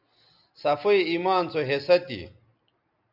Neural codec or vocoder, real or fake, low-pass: none; real; 5.4 kHz